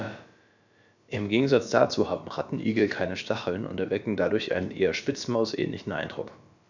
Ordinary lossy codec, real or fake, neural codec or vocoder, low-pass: none; fake; codec, 16 kHz, about 1 kbps, DyCAST, with the encoder's durations; 7.2 kHz